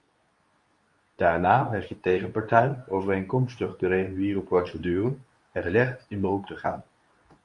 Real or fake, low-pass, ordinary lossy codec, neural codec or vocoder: fake; 10.8 kHz; MP3, 64 kbps; codec, 24 kHz, 0.9 kbps, WavTokenizer, medium speech release version 2